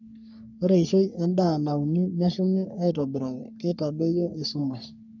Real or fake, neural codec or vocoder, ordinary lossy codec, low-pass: fake; codec, 44.1 kHz, 3.4 kbps, Pupu-Codec; none; 7.2 kHz